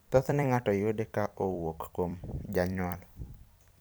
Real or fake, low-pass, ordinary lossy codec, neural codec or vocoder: fake; none; none; vocoder, 44.1 kHz, 128 mel bands every 256 samples, BigVGAN v2